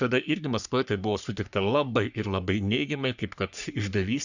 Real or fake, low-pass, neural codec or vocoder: fake; 7.2 kHz; codec, 44.1 kHz, 3.4 kbps, Pupu-Codec